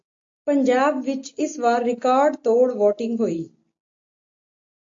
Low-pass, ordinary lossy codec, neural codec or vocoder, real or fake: 7.2 kHz; AAC, 48 kbps; none; real